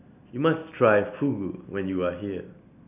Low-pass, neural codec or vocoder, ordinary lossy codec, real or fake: 3.6 kHz; none; none; real